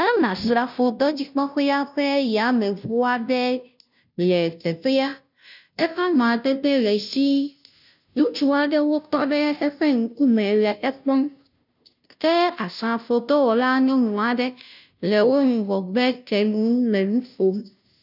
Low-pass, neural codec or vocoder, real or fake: 5.4 kHz; codec, 16 kHz, 0.5 kbps, FunCodec, trained on Chinese and English, 25 frames a second; fake